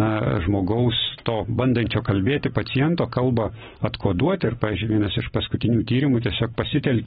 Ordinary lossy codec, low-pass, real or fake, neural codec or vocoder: AAC, 16 kbps; 7.2 kHz; real; none